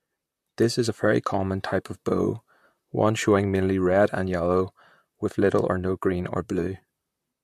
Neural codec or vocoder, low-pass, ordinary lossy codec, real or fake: vocoder, 44.1 kHz, 128 mel bands, Pupu-Vocoder; 14.4 kHz; MP3, 64 kbps; fake